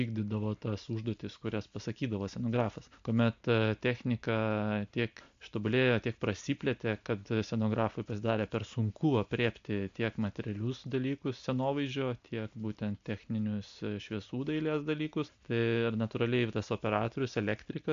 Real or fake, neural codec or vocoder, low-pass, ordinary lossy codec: real; none; 7.2 kHz; MP3, 64 kbps